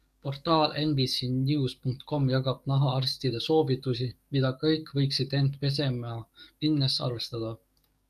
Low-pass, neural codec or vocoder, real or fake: 14.4 kHz; codec, 44.1 kHz, 7.8 kbps, DAC; fake